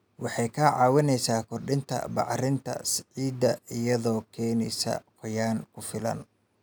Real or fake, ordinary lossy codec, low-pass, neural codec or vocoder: real; none; none; none